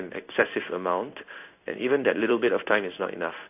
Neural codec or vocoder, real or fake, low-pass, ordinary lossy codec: codec, 16 kHz in and 24 kHz out, 1 kbps, XY-Tokenizer; fake; 3.6 kHz; AAC, 32 kbps